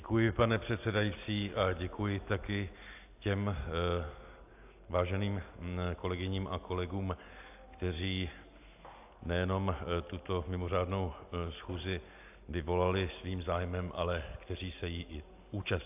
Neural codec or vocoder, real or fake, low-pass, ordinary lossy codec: none; real; 3.6 kHz; AAC, 32 kbps